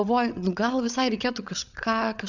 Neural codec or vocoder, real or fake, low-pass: codec, 16 kHz, 8 kbps, FreqCodec, larger model; fake; 7.2 kHz